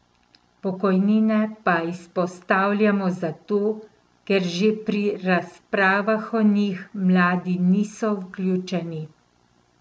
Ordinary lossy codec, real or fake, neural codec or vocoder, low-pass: none; real; none; none